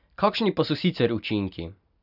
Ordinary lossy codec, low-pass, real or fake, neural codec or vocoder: none; 5.4 kHz; real; none